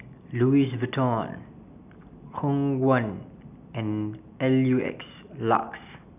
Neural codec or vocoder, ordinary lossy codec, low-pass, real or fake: none; none; 3.6 kHz; real